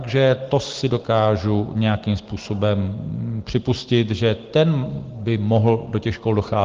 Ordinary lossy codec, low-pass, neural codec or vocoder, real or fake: Opus, 16 kbps; 7.2 kHz; none; real